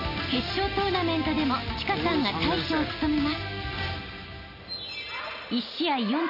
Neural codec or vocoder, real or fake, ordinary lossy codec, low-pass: none; real; none; 5.4 kHz